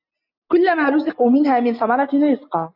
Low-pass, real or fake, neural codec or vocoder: 5.4 kHz; real; none